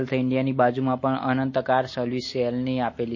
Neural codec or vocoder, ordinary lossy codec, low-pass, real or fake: none; MP3, 32 kbps; 7.2 kHz; real